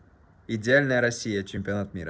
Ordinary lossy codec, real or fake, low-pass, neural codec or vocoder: none; real; none; none